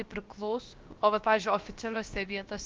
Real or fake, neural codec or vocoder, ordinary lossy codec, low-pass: fake; codec, 16 kHz, about 1 kbps, DyCAST, with the encoder's durations; Opus, 32 kbps; 7.2 kHz